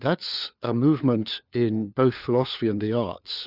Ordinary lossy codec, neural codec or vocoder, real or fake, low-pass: Opus, 64 kbps; codec, 16 kHz, 4 kbps, FunCodec, trained on LibriTTS, 50 frames a second; fake; 5.4 kHz